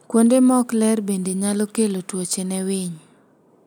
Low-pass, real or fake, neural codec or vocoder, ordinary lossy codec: none; real; none; none